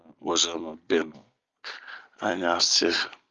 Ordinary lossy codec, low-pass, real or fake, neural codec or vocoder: Opus, 32 kbps; 7.2 kHz; real; none